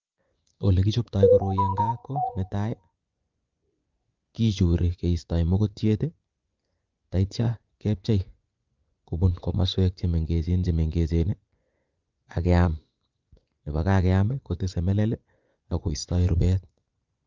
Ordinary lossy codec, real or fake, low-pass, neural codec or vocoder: Opus, 24 kbps; fake; 7.2 kHz; vocoder, 24 kHz, 100 mel bands, Vocos